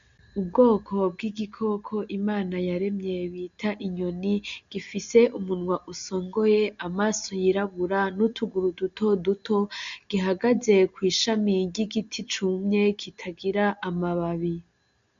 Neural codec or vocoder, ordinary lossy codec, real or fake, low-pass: none; MP3, 64 kbps; real; 7.2 kHz